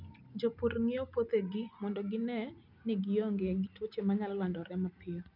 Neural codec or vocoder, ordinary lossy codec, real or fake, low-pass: none; none; real; 5.4 kHz